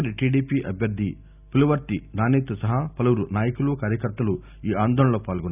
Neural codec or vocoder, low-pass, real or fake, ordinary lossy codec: none; 3.6 kHz; real; none